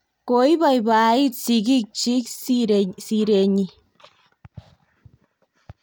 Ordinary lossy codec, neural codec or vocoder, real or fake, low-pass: none; none; real; none